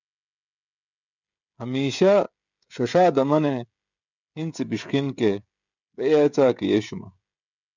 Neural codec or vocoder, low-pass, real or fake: codec, 16 kHz, 16 kbps, FreqCodec, smaller model; 7.2 kHz; fake